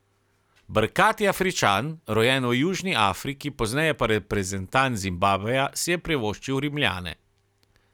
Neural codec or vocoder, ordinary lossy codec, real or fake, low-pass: none; none; real; 19.8 kHz